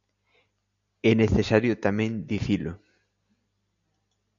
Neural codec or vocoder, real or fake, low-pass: none; real; 7.2 kHz